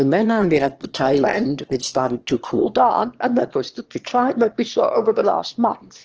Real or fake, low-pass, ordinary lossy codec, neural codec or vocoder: fake; 7.2 kHz; Opus, 16 kbps; autoencoder, 22.05 kHz, a latent of 192 numbers a frame, VITS, trained on one speaker